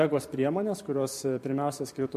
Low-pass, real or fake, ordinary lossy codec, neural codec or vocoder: 14.4 kHz; fake; MP3, 64 kbps; vocoder, 44.1 kHz, 128 mel bands every 512 samples, BigVGAN v2